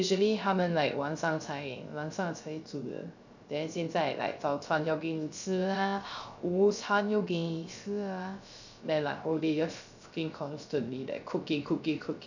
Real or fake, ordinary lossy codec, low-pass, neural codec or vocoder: fake; none; 7.2 kHz; codec, 16 kHz, 0.3 kbps, FocalCodec